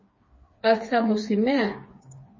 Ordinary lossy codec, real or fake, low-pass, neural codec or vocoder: MP3, 32 kbps; fake; 7.2 kHz; codec, 16 kHz, 4 kbps, FreqCodec, smaller model